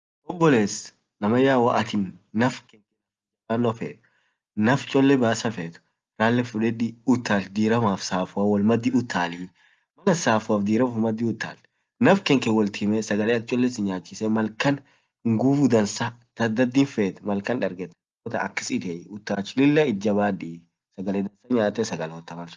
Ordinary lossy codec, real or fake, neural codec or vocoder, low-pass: Opus, 32 kbps; real; none; 7.2 kHz